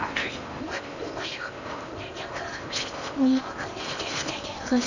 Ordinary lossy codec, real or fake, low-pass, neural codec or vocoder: none; fake; 7.2 kHz; codec, 16 kHz in and 24 kHz out, 0.8 kbps, FocalCodec, streaming, 65536 codes